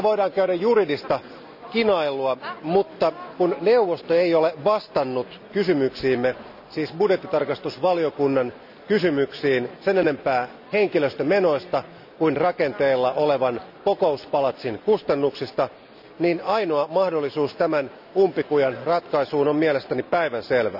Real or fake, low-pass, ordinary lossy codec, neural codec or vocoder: real; 5.4 kHz; none; none